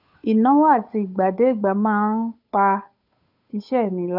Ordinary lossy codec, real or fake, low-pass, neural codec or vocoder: none; fake; 5.4 kHz; codec, 16 kHz, 8 kbps, FunCodec, trained on Chinese and English, 25 frames a second